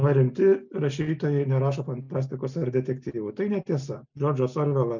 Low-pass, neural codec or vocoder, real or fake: 7.2 kHz; none; real